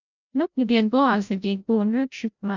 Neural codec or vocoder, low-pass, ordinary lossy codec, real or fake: codec, 16 kHz, 0.5 kbps, FreqCodec, larger model; 7.2 kHz; none; fake